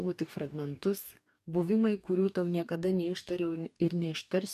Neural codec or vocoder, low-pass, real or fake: codec, 44.1 kHz, 2.6 kbps, DAC; 14.4 kHz; fake